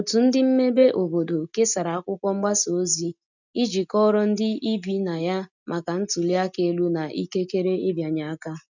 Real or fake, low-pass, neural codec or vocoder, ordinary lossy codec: real; 7.2 kHz; none; none